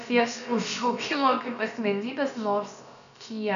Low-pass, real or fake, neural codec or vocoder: 7.2 kHz; fake; codec, 16 kHz, about 1 kbps, DyCAST, with the encoder's durations